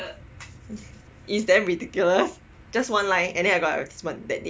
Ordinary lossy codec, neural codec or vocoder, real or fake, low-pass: none; none; real; none